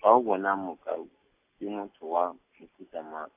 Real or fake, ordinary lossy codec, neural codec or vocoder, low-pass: real; AAC, 32 kbps; none; 3.6 kHz